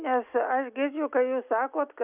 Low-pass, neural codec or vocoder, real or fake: 3.6 kHz; none; real